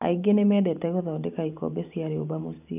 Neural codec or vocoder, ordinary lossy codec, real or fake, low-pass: vocoder, 44.1 kHz, 128 mel bands every 512 samples, BigVGAN v2; none; fake; 3.6 kHz